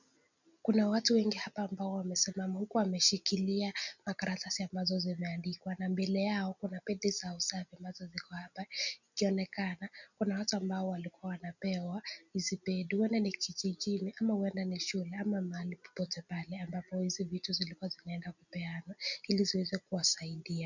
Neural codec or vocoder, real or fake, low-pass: none; real; 7.2 kHz